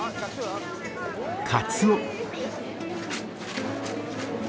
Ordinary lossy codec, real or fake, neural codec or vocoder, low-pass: none; real; none; none